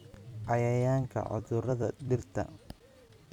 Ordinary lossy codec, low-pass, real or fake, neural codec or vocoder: none; 19.8 kHz; fake; vocoder, 44.1 kHz, 128 mel bands every 256 samples, BigVGAN v2